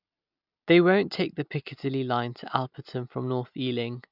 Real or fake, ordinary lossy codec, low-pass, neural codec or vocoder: real; none; 5.4 kHz; none